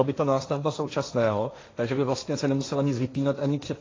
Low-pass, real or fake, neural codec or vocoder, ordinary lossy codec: 7.2 kHz; fake; codec, 16 kHz, 1.1 kbps, Voila-Tokenizer; AAC, 32 kbps